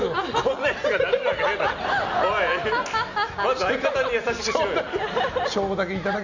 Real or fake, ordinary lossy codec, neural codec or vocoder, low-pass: real; none; none; 7.2 kHz